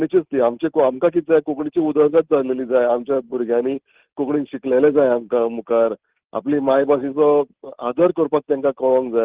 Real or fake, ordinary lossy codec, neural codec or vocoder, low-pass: real; Opus, 16 kbps; none; 3.6 kHz